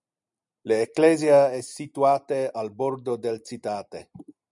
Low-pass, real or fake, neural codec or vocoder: 10.8 kHz; real; none